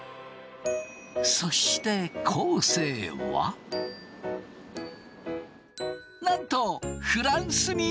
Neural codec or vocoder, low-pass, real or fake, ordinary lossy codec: none; none; real; none